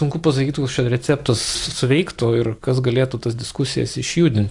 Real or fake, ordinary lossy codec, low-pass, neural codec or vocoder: real; AAC, 64 kbps; 10.8 kHz; none